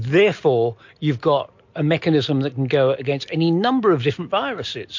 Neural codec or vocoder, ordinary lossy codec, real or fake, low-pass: none; MP3, 48 kbps; real; 7.2 kHz